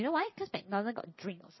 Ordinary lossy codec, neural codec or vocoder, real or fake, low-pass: MP3, 24 kbps; vocoder, 22.05 kHz, 80 mel bands, Vocos; fake; 7.2 kHz